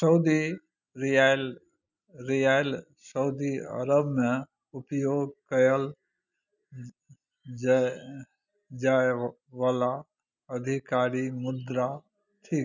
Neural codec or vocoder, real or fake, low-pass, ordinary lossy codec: none; real; 7.2 kHz; none